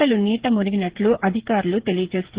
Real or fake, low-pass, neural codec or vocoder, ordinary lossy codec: fake; 3.6 kHz; codec, 16 kHz, 8 kbps, FreqCodec, smaller model; Opus, 16 kbps